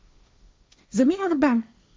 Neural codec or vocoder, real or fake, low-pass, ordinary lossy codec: codec, 16 kHz, 1.1 kbps, Voila-Tokenizer; fake; none; none